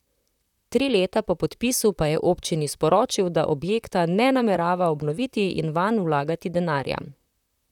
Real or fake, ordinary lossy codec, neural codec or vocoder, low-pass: fake; none; vocoder, 44.1 kHz, 128 mel bands, Pupu-Vocoder; 19.8 kHz